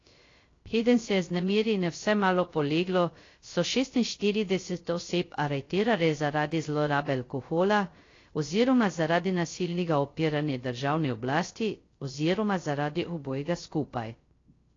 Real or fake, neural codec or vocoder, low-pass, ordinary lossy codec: fake; codec, 16 kHz, 0.3 kbps, FocalCodec; 7.2 kHz; AAC, 32 kbps